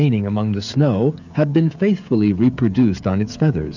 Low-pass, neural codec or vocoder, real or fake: 7.2 kHz; codec, 16 kHz, 16 kbps, FreqCodec, smaller model; fake